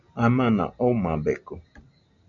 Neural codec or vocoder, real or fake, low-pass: none; real; 7.2 kHz